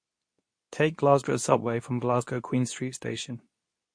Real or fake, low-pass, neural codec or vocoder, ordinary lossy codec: fake; 9.9 kHz; codec, 24 kHz, 0.9 kbps, WavTokenizer, medium speech release version 2; MP3, 48 kbps